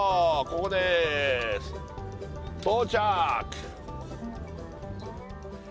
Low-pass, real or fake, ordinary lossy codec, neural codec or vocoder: none; real; none; none